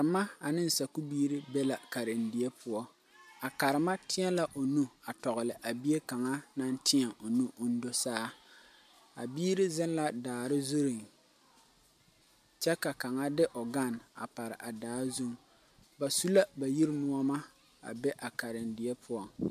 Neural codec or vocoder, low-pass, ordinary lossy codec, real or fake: none; 14.4 kHz; MP3, 96 kbps; real